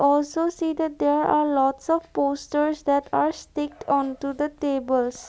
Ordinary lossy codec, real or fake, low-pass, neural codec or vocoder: none; real; none; none